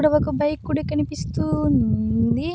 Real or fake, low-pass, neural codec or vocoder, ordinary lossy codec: real; none; none; none